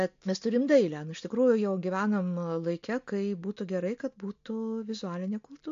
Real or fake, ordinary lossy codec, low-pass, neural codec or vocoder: real; MP3, 48 kbps; 7.2 kHz; none